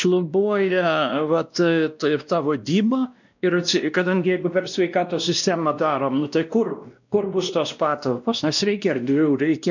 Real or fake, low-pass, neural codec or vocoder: fake; 7.2 kHz; codec, 16 kHz, 1 kbps, X-Codec, WavLM features, trained on Multilingual LibriSpeech